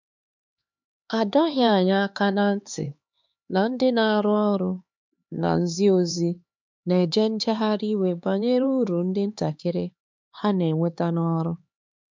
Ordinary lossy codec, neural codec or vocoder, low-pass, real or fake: MP3, 64 kbps; codec, 16 kHz, 4 kbps, X-Codec, HuBERT features, trained on LibriSpeech; 7.2 kHz; fake